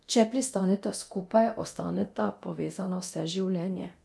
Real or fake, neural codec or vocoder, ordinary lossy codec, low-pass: fake; codec, 24 kHz, 0.9 kbps, DualCodec; none; none